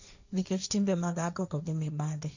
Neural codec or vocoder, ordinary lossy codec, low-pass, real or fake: codec, 16 kHz, 1.1 kbps, Voila-Tokenizer; none; 7.2 kHz; fake